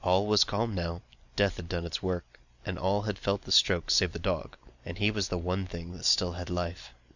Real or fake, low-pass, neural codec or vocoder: real; 7.2 kHz; none